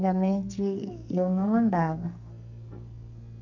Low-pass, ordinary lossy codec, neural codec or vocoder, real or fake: 7.2 kHz; none; codec, 44.1 kHz, 2.6 kbps, SNAC; fake